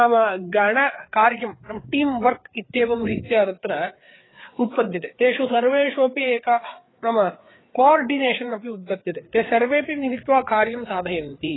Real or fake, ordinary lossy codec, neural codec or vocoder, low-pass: fake; AAC, 16 kbps; codec, 16 kHz, 4 kbps, FreqCodec, larger model; 7.2 kHz